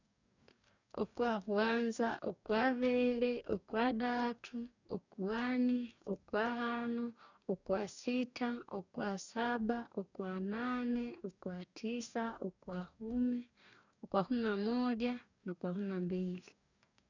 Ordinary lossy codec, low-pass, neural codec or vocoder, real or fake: none; 7.2 kHz; codec, 44.1 kHz, 2.6 kbps, DAC; fake